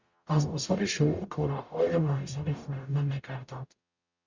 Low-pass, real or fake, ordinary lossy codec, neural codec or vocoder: 7.2 kHz; fake; Opus, 64 kbps; codec, 44.1 kHz, 0.9 kbps, DAC